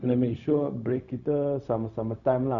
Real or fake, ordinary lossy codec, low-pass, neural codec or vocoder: fake; none; 7.2 kHz; codec, 16 kHz, 0.4 kbps, LongCat-Audio-Codec